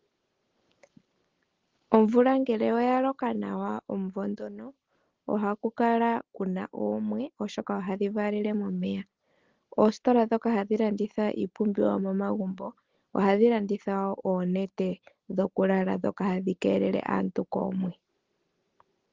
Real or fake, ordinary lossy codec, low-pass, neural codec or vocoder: real; Opus, 16 kbps; 7.2 kHz; none